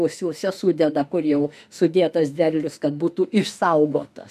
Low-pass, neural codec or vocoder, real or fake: 14.4 kHz; autoencoder, 48 kHz, 32 numbers a frame, DAC-VAE, trained on Japanese speech; fake